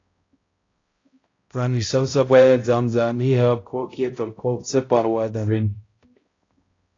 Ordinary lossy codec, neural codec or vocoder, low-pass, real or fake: AAC, 32 kbps; codec, 16 kHz, 0.5 kbps, X-Codec, HuBERT features, trained on balanced general audio; 7.2 kHz; fake